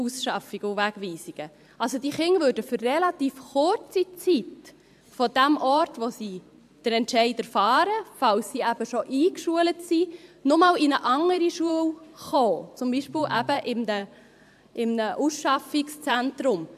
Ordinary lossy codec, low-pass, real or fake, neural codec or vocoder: AAC, 96 kbps; 14.4 kHz; fake; vocoder, 44.1 kHz, 128 mel bands every 512 samples, BigVGAN v2